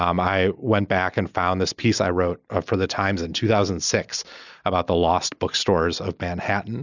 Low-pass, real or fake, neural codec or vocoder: 7.2 kHz; real; none